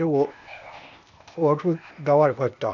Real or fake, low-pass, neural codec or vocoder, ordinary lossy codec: fake; 7.2 kHz; codec, 16 kHz, 0.8 kbps, ZipCodec; none